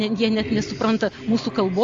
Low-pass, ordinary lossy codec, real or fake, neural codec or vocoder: 7.2 kHz; Opus, 32 kbps; real; none